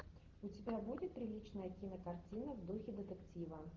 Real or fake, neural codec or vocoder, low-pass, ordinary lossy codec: real; none; 7.2 kHz; Opus, 16 kbps